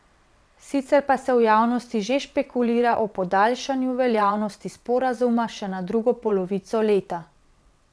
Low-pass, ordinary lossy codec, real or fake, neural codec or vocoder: none; none; fake; vocoder, 22.05 kHz, 80 mel bands, WaveNeXt